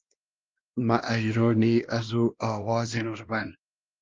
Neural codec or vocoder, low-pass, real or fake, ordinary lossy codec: codec, 16 kHz, 1 kbps, X-Codec, WavLM features, trained on Multilingual LibriSpeech; 7.2 kHz; fake; Opus, 32 kbps